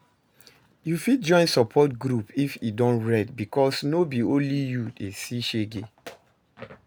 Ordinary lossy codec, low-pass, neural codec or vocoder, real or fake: none; none; none; real